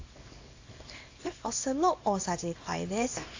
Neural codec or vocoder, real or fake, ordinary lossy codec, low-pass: codec, 24 kHz, 0.9 kbps, WavTokenizer, small release; fake; AAC, 48 kbps; 7.2 kHz